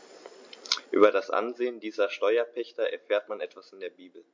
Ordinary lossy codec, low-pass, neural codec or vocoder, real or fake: MP3, 48 kbps; 7.2 kHz; none; real